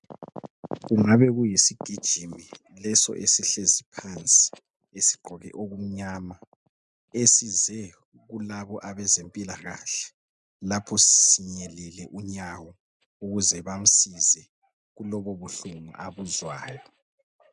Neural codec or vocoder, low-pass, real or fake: none; 10.8 kHz; real